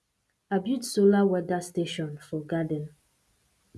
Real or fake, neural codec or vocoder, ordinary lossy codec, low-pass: real; none; none; none